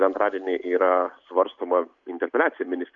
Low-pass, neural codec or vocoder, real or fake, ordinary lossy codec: 7.2 kHz; none; real; Opus, 64 kbps